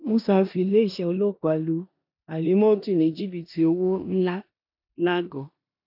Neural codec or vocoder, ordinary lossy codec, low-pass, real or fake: codec, 16 kHz in and 24 kHz out, 0.9 kbps, LongCat-Audio-Codec, four codebook decoder; none; 5.4 kHz; fake